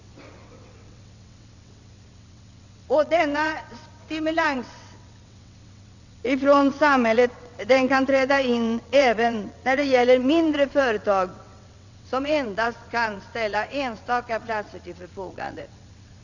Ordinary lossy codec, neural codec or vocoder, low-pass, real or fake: none; vocoder, 22.05 kHz, 80 mel bands, WaveNeXt; 7.2 kHz; fake